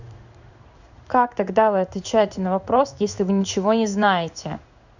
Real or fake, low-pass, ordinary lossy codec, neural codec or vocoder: fake; 7.2 kHz; none; codec, 16 kHz in and 24 kHz out, 1 kbps, XY-Tokenizer